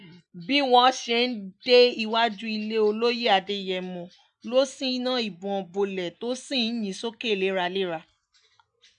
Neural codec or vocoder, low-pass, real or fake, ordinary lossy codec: none; 9.9 kHz; real; none